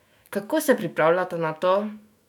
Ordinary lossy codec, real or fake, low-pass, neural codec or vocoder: none; fake; 19.8 kHz; autoencoder, 48 kHz, 128 numbers a frame, DAC-VAE, trained on Japanese speech